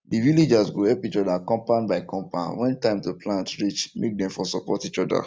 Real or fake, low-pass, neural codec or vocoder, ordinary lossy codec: real; none; none; none